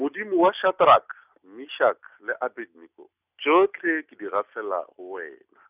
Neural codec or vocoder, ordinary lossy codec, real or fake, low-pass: none; none; real; 3.6 kHz